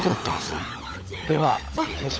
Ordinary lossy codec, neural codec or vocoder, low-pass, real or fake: none; codec, 16 kHz, 16 kbps, FunCodec, trained on LibriTTS, 50 frames a second; none; fake